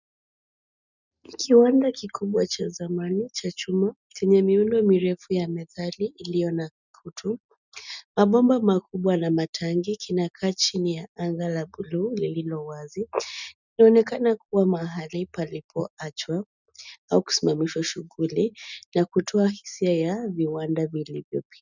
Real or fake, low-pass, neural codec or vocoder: real; 7.2 kHz; none